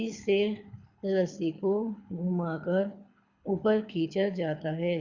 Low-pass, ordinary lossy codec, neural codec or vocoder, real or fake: 7.2 kHz; Opus, 64 kbps; codec, 24 kHz, 6 kbps, HILCodec; fake